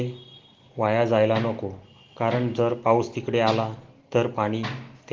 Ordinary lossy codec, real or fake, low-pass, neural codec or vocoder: Opus, 16 kbps; real; 7.2 kHz; none